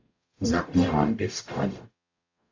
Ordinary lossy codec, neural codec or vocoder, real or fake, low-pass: AAC, 48 kbps; codec, 44.1 kHz, 0.9 kbps, DAC; fake; 7.2 kHz